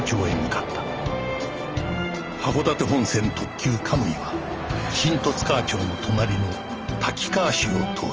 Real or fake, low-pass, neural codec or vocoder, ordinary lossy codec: real; 7.2 kHz; none; Opus, 24 kbps